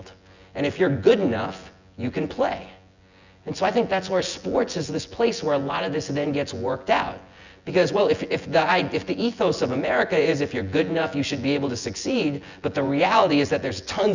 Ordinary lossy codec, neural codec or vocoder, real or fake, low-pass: Opus, 64 kbps; vocoder, 24 kHz, 100 mel bands, Vocos; fake; 7.2 kHz